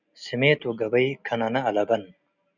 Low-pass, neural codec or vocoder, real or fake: 7.2 kHz; none; real